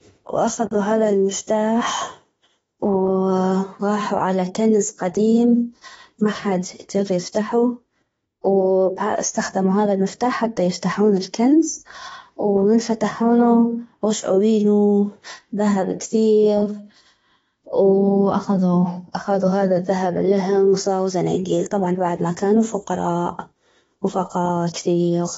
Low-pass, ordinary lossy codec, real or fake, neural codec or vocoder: 19.8 kHz; AAC, 24 kbps; fake; autoencoder, 48 kHz, 32 numbers a frame, DAC-VAE, trained on Japanese speech